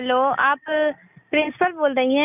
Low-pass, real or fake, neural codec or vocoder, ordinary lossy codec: 3.6 kHz; real; none; none